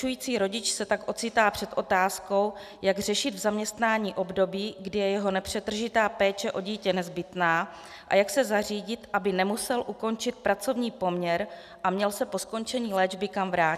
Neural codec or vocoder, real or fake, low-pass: none; real; 14.4 kHz